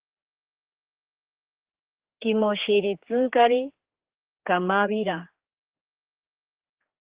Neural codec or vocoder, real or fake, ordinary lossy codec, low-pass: codec, 16 kHz, 4 kbps, X-Codec, HuBERT features, trained on general audio; fake; Opus, 16 kbps; 3.6 kHz